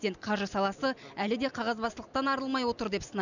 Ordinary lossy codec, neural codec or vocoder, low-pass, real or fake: none; none; 7.2 kHz; real